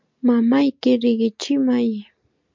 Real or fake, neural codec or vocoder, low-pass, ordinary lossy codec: real; none; 7.2 kHz; MP3, 64 kbps